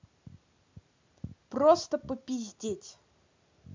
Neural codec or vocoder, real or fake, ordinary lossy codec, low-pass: none; real; MP3, 64 kbps; 7.2 kHz